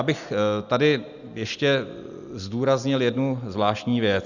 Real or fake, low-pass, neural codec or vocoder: real; 7.2 kHz; none